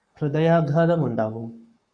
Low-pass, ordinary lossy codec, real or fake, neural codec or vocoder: 9.9 kHz; Opus, 64 kbps; fake; codec, 44.1 kHz, 7.8 kbps, Pupu-Codec